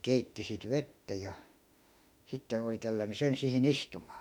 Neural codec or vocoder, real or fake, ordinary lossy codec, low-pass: autoencoder, 48 kHz, 32 numbers a frame, DAC-VAE, trained on Japanese speech; fake; none; 19.8 kHz